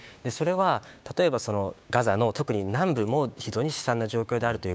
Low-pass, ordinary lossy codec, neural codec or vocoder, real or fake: none; none; codec, 16 kHz, 6 kbps, DAC; fake